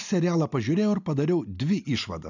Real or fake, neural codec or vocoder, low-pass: real; none; 7.2 kHz